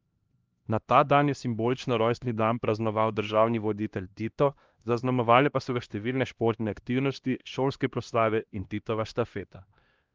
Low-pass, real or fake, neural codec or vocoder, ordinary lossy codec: 7.2 kHz; fake; codec, 16 kHz, 1 kbps, X-Codec, HuBERT features, trained on LibriSpeech; Opus, 24 kbps